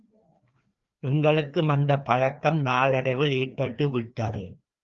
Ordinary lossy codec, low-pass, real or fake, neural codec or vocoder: Opus, 32 kbps; 7.2 kHz; fake; codec, 16 kHz, 2 kbps, FreqCodec, larger model